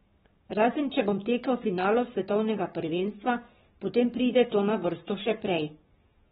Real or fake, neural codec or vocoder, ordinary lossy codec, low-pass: fake; codec, 44.1 kHz, 7.8 kbps, Pupu-Codec; AAC, 16 kbps; 19.8 kHz